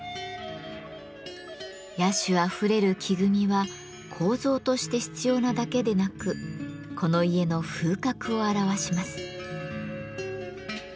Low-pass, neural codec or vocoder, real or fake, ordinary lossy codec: none; none; real; none